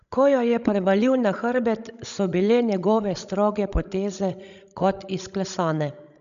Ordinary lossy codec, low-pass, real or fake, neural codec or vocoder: none; 7.2 kHz; fake; codec, 16 kHz, 16 kbps, FreqCodec, larger model